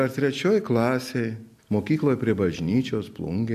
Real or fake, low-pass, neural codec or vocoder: real; 14.4 kHz; none